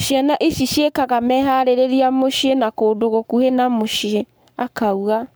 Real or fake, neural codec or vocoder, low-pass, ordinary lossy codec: fake; codec, 44.1 kHz, 7.8 kbps, Pupu-Codec; none; none